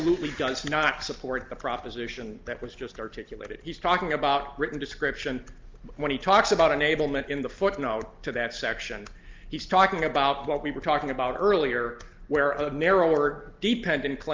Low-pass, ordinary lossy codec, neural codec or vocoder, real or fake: 7.2 kHz; Opus, 32 kbps; none; real